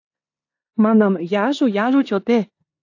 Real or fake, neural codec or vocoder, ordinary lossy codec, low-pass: fake; codec, 16 kHz in and 24 kHz out, 0.9 kbps, LongCat-Audio-Codec, four codebook decoder; AAC, 48 kbps; 7.2 kHz